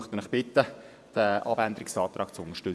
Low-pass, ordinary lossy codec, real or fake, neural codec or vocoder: none; none; fake; vocoder, 24 kHz, 100 mel bands, Vocos